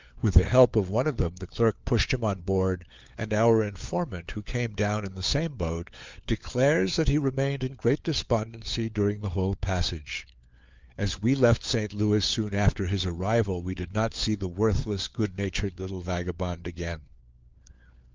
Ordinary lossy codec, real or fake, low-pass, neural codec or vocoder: Opus, 32 kbps; fake; 7.2 kHz; codec, 16 kHz, 4 kbps, FunCodec, trained on LibriTTS, 50 frames a second